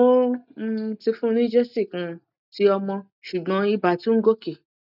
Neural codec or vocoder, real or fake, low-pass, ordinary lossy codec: none; real; 5.4 kHz; none